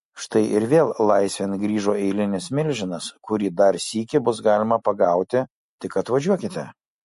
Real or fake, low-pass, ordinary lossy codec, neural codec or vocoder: real; 14.4 kHz; MP3, 48 kbps; none